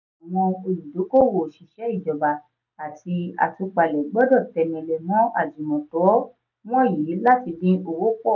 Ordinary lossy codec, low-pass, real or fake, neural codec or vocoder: none; 7.2 kHz; real; none